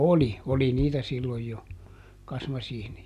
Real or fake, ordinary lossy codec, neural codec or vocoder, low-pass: real; none; none; 14.4 kHz